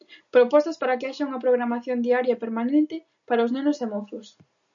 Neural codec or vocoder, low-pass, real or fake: none; 7.2 kHz; real